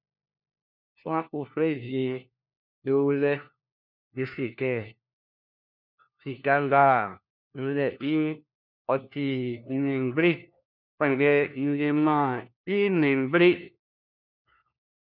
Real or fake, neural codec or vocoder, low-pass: fake; codec, 16 kHz, 1 kbps, FunCodec, trained on LibriTTS, 50 frames a second; 5.4 kHz